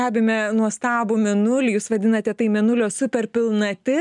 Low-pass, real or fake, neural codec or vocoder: 10.8 kHz; real; none